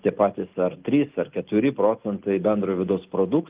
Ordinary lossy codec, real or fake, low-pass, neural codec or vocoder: Opus, 32 kbps; real; 3.6 kHz; none